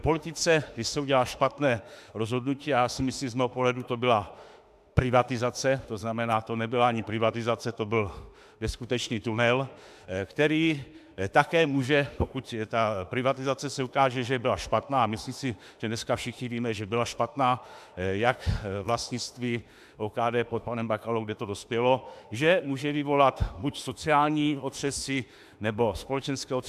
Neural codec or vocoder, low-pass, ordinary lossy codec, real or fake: autoencoder, 48 kHz, 32 numbers a frame, DAC-VAE, trained on Japanese speech; 14.4 kHz; AAC, 96 kbps; fake